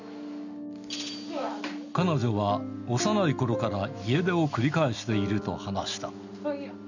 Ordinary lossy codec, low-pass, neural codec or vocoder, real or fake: none; 7.2 kHz; none; real